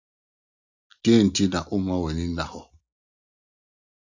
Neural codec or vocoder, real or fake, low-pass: none; real; 7.2 kHz